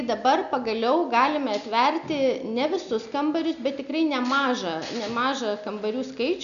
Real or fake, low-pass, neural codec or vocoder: real; 7.2 kHz; none